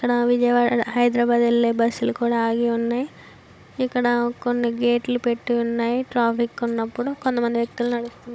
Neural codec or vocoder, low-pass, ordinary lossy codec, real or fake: codec, 16 kHz, 16 kbps, FunCodec, trained on Chinese and English, 50 frames a second; none; none; fake